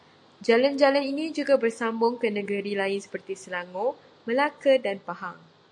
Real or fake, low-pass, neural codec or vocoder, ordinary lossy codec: real; 10.8 kHz; none; AAC, 64 kbps